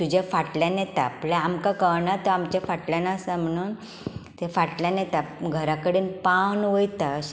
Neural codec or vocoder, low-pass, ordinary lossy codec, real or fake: none; none; none; real